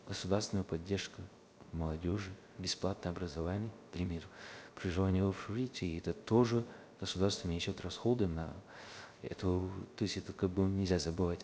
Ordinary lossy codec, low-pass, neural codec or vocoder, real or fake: none; none; codec, 16 kHz, 0.3 kbps, FocalCodec; fake